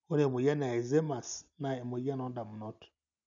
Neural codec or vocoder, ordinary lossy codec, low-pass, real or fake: none; none; 7.2 kHz; real